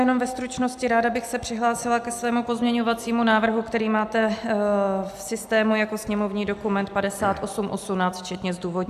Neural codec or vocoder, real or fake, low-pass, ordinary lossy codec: none; real; 14.4 kHz; AAC, 96 kbps